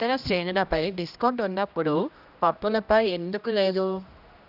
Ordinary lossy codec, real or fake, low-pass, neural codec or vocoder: none; fake; 5.4 kHz; codec, 16 kHz, 1 kbps, X-Codec, HuBERT features, trained on general audio